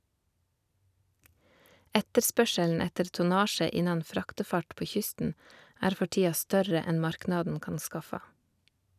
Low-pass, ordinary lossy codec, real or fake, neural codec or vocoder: 14.4 kHz; none; real; none